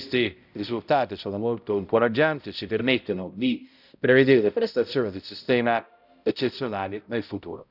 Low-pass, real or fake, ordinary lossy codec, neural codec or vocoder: 5.4 kHz; fake; none; codec, 16 kHz, 0.5 kbps, X-Codec, HuBERT features, trained on balanced general audio